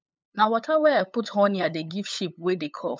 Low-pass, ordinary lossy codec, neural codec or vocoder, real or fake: none; none; codec, 16 kHz, 8 kbps, FunCodec, trained on LibriTTS, 25 frames a second; fake